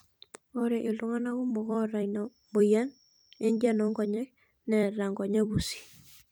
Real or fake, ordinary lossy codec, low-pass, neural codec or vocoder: fake; none; none; vocoder, 44.1 kHz, 128 mel bands every 256 samples, BigVGAN v2